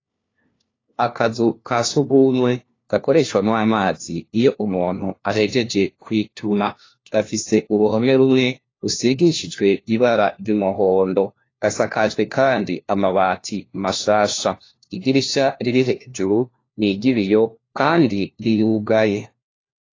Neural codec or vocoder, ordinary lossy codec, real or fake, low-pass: codec, 16 kHz, 1 kbps, FunCodec, trained on LibriTTS, 50 frames a second; AAC, 32 kbps; fake; 7.2 kHz